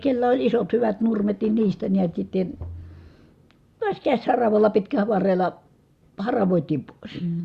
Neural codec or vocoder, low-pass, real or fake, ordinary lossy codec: none; 14.4 kHz; real; Opus, 64 kbps